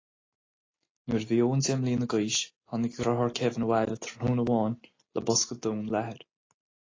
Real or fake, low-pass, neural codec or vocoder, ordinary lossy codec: real; 7.2 kHz; none; AAC, 32 kbps